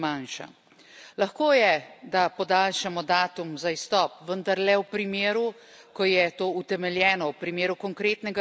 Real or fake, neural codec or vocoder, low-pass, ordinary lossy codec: real; none; none; none